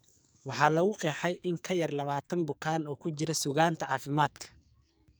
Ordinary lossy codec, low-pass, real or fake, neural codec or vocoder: none; none; fake; codec, 44.1 kHz, 2.6 kbps, SNAC